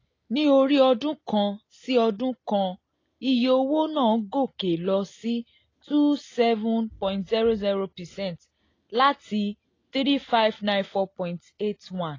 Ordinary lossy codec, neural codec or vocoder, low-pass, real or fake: AAC, 32 kbps; none; 7.2 kHz; real